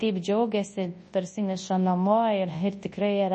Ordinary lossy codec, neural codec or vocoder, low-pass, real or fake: MP3, 32 kbps; codec, 24 kHz, 0.9 kbps, WavTokenizer, large speech release; 10.8 kHz; fake